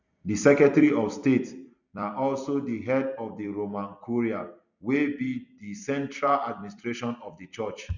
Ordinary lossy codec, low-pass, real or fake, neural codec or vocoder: none; 7.2 kHz; fake; vocoder, 44.1 kHz, 128 mel bands every 256 samples, BigVGAN v2